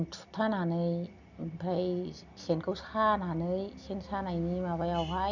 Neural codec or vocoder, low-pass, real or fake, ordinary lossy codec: none; 7.2 kHz; real; none